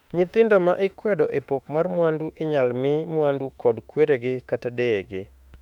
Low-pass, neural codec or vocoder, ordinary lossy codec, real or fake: 19.8 kHz; autoencoder, 48 kHz, 32 numbers a frame, DAC-VAE, trained on Japanese speech; none; fake